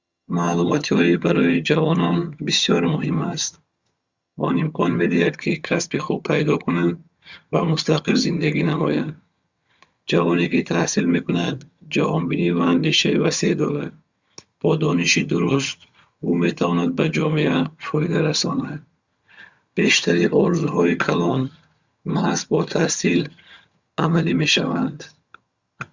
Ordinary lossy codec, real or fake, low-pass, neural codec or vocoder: Opus, 64 kbps; fake; 7.2 kHz; vocoder, 22.05 kHz, 80 mel bands, HiFi-GAN